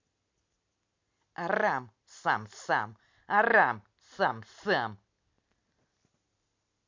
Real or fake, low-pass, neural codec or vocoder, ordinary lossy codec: real; 7.2 kHz; none; MP3, 64 kbps